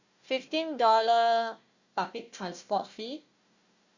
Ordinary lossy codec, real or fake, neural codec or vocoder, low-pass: Opus, 64 kbps; fake; codec, 16 kHz, 1 kbps, FunCodec, trained on Chinese and English, 50 frames a second; 7.2 kHz